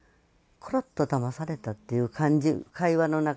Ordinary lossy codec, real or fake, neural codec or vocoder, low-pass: none; real; none; none